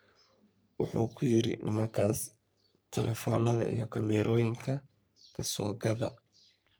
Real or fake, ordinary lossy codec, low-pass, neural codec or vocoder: fake; none; none; codec, 44.1 kHz, 3.4 kbps, Pupu-Codec